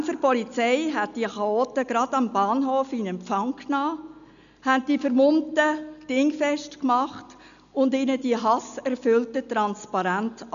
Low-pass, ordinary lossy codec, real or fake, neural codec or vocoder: 7.2 kHz; none; real; none